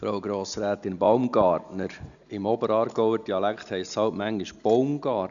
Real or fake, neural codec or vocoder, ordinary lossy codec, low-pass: real; none; none; 7.2 kHz